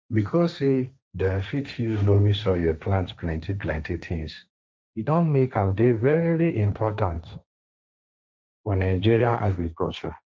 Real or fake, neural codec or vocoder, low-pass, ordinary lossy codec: fake; codec, 16 kHz, 1.1 kbps, Voila-Tokenizer; none; none